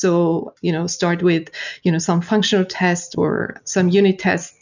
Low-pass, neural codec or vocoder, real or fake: 7.2 kHz; none; real